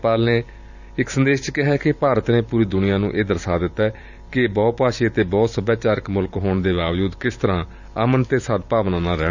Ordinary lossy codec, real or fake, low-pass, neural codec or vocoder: none; fake; 7.2 kHz; vocoder, 44.1 kHz, 128 mel bands every 512 samples, BigVGAN v2